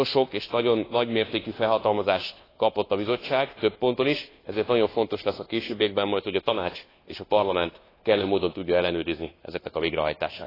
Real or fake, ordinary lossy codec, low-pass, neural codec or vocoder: fake; AAC, 24 kbps; 5.4 kHz; codec, 24 kHz, 1.2 kbps, DualCodec